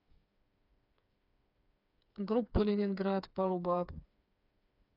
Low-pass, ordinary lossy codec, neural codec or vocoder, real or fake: 5.4 kHz; none; codec, 16 kHz, 4 kbps, FreqCodec, smaller model; fake